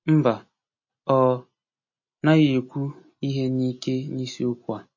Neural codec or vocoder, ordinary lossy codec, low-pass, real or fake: none; MP3, 32 kbps; 7.2 kHz; real